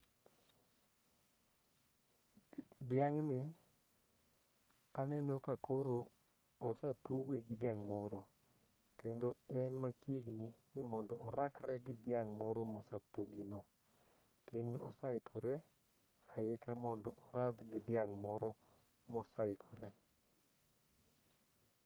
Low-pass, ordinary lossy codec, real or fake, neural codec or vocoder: none; none; fake; codec, 44.1 kHz, 1.7 kbps, Pupu-Codec